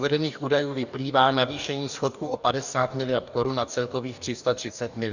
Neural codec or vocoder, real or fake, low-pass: codec, 44.1 kHz, 2.6 kbps, DAC; fake; 7.2 kHz